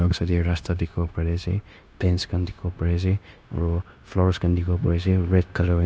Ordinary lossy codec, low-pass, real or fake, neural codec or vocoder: none; none; fake; codec, 16 kHz, 0.9 kbps, LongCat-Audio-Codec